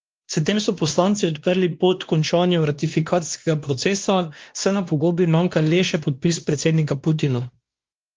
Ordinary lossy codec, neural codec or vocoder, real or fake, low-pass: Opus, 32 kbps; codec, 16 kHz, 1 kbps, X-Codec, WavLM features, trained on Multilingual LibriSpeech; fake; 7.2 kHz